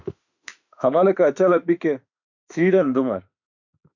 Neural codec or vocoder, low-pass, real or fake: autoencoder, 48 kHz, 32 numbers a frame, DAC-VAE, trained on Japanese speech; 7.2 kHz; fake